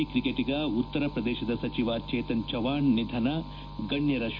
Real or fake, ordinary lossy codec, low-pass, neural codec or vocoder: real; none; 7.2 kHz; none